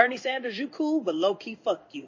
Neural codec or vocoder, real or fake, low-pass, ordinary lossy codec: codec, 16 kHz in and 24 kHz out, 1 kbps, XY-Tokenizer; fake; 7.2 kHz; MP3, 32 kbps